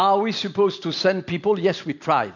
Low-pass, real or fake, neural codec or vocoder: 7.2 kHz; real; none